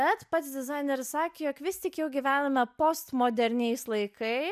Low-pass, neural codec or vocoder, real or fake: 14.4 kHz; none; real